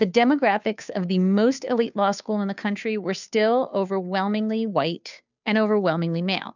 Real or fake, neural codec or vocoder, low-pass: fake; codec, 16 kHz, 2 kbps, FunCodec, trained on Chinese and English, 25 frames a second; 7.2 kHz